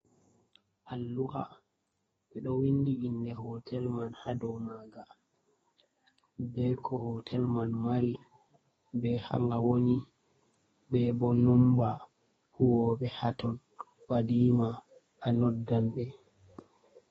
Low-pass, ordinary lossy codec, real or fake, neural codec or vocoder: 14.4 kHz; AAC, 24 kbps; fake; codec, 32 kHz, 1.9 kbps, SNAC